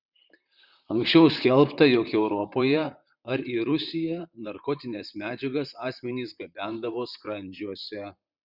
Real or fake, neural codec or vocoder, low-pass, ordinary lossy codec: fake; vocoder, 44.1 kHz, 128 mel bands, Pupu-Vocoder; 5.4 kHz; Opus, 64 kbps